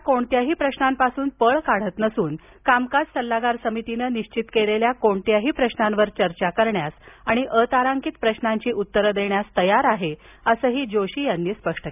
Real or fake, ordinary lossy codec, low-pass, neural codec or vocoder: real; none; 3.6 kHz; none